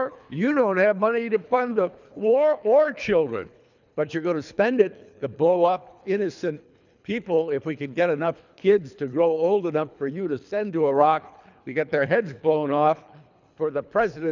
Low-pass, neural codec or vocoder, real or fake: 7.2 kHz; codec, 24 kHz, 3 kbps, HILCodec; fake